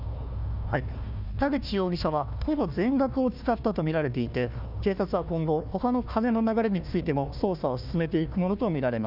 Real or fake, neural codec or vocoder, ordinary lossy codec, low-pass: fake; codec, 16 kHz, 1 kbps, FunCodec, trained on Chinese and English, 50 frames a second; none; 5.4 kHz